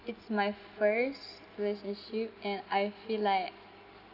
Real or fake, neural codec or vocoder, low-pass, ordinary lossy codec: real; none; 5.4 kHz; none